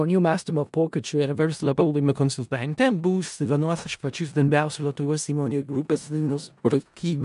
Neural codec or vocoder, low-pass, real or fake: codec, 16 kHz in and 24 kHz out, 0.4 kbps, LongCat-Audio-Codec, four codebook decoder; 10.8 kHz; fake